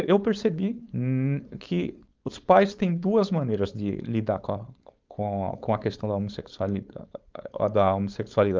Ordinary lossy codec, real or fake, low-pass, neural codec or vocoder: Opus, 32 kbps; fake; 7.2 kHz; codec, 16 kHz, 4.8 kbps, FACodec